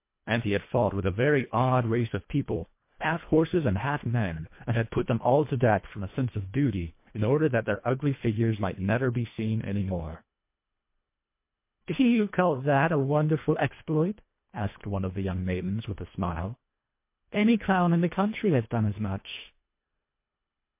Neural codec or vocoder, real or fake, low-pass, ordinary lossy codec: codec, 24 kHz, 1.5 kbps, HILCodec; fake; 3.6 kHz; MP3, 24 kbps